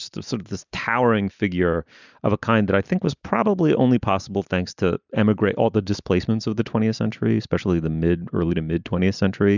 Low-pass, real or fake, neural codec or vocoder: 7.2 kHz; real; none